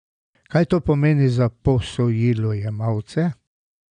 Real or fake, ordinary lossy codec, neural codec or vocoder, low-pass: real; none; none; 9.9 kHz